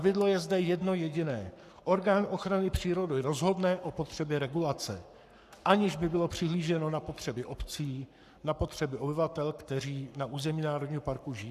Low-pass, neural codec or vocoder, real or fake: 14.4 kHz; codec, 44.1 kHz, 7.8 kbps, Pupu-Codec; fake